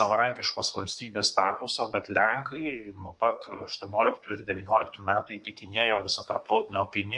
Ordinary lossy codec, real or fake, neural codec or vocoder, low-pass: MP3, 64 kbps; fake; codec, 24 kHz, 1 kbps, SNAC; 10.8 kHz